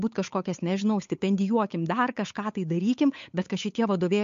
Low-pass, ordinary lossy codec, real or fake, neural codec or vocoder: 7.2 kHz; MP3, 48 kbps; fake; codec, 16 kHz, 8 kbps, FunCodec, trained on Chinese and English, 25 frames a second